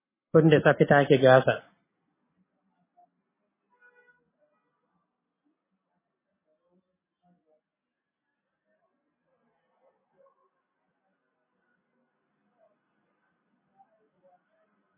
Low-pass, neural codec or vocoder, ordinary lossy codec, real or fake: 3.6 kHz; none; MP3, 16 kbps; real